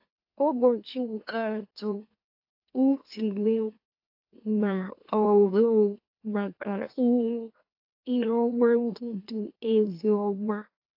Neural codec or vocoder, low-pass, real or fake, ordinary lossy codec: autoencoder, 44.1 kHz, a latent of 192 numbers a frame, MeloTTS; 5.4 kHz; fake; AAC, 32 kbps